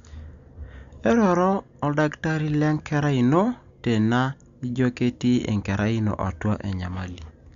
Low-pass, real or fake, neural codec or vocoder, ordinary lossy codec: 7.2 kHz; real; none; Opus, 64 kbps